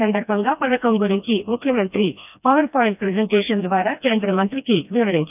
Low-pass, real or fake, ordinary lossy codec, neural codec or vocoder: 3.6 kHz; fake; none; codec, 16 kHz, 1 kbps, FreqCodec, smaller model